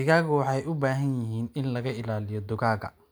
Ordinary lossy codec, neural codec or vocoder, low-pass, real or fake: none; none; none; real